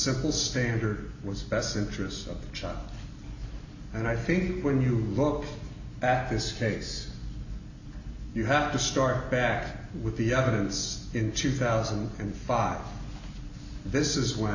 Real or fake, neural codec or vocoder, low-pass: real; none; 7.2 kHz